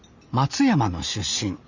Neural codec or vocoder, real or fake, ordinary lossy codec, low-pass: none; real; Opus, 64 kbps; 7.2 kHz